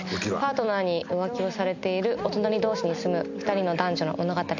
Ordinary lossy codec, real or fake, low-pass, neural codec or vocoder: none; real; 7.2 kHz; none